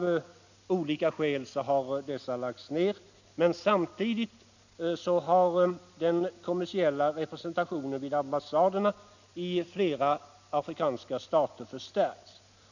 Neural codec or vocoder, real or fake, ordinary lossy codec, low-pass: none; real; none; 7.2 kHz